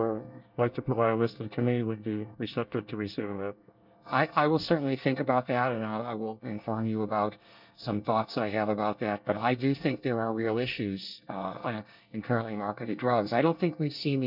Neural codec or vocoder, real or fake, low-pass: codec, 24 kHz, 1 kbps, SNAC; fake; 5.4 kHz